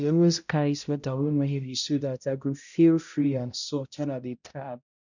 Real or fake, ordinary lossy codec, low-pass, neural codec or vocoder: fake; none; 7.2 kHz; codec, 16 kHz, 0.5 kbps, X-Codec, HuBERT features, trained on balanced general audio